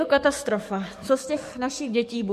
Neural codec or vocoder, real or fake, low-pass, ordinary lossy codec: codec, 44.1 kHz, 7.8 kbps, DAC; fake; 14.4 kHz; MP3, 64 kbps